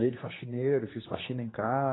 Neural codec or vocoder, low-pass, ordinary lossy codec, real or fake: codec, 16 kHz, 2 kbps, X-Codec, HuBERT features, trained on general audio; 7.2 kHz; AAC, 16 kbps; fake